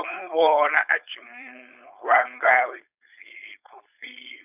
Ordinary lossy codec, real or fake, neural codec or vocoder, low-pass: none; fake; codec, 16 kHz, 4.8 kbps, FACodec; 3.6 kHz